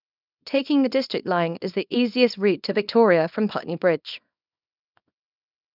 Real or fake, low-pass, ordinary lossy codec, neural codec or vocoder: fake; 5.4 kHz; none; codec, 24 kHz, 0.9 kbps, WavTokenizer, small release